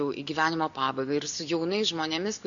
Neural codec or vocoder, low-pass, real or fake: none; 7.2 kHz; real